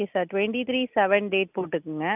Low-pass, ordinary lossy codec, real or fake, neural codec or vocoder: 3.6 kHz; none; real; none